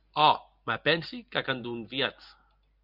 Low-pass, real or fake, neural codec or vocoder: 5.4 kHz; real; none